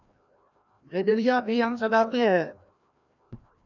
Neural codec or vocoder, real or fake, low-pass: codec, 16 kHz, 1 kbps, FreqCodec, larger model; fake; 7.2 kHz